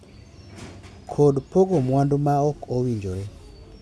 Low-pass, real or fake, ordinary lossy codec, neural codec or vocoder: none; real; none; none